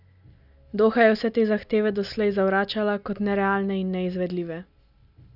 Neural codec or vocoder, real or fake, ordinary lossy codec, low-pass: none; real; none; 5.4 kHz